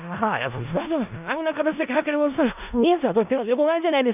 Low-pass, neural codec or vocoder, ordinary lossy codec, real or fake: 3.6 kHz; codec, 16 kHz in and 24 kHz out, 0.4 kbps, LongCat-Audio-Codec, four codebook decoder; none; fake